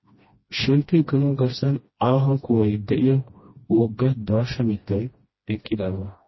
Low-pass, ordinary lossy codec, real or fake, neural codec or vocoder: 7.2 kHz; MP3, 24 kbps; fake; codec, 16 kHz, 1 kbps, FreqCodec, smaller model